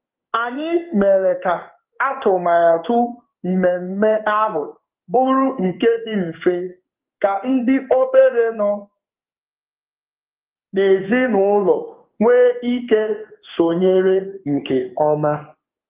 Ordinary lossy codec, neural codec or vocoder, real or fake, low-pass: Opus, 32 kbps; codec, 16 kHz in and 24 kHz out, 1 kbps, XY-Tokenizer; fake; 3.6 kHz